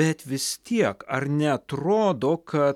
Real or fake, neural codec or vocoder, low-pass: real; none; 19.8 kHz